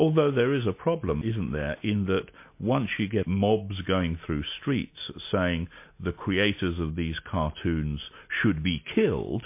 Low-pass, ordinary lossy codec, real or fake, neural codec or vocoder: 3.6 kHz; MP3, 24 kbps; real; none